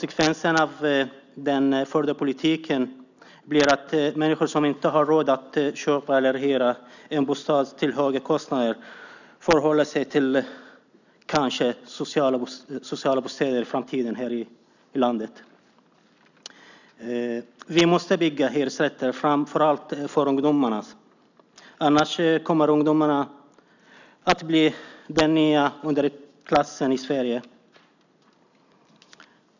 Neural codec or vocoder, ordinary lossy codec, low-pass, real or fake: none; none; 7.2 kHz; real